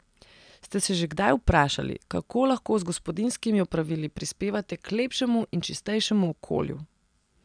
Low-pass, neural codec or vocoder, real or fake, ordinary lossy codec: 9.9 kHz; none; real; none